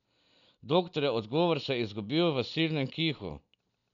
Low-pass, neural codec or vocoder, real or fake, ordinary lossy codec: 7.2 kHz; none; real; none